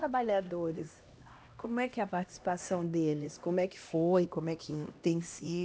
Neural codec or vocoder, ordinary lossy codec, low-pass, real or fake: codec, 16 kHz, 1 kbps, X-Codec, HuBERT features, trained on LibriSpeech; none; none; fake